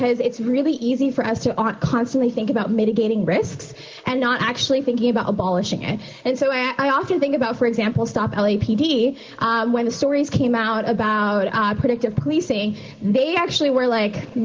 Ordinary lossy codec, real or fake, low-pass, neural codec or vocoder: Opus, 16 kbps; real; 7.2 kHz; none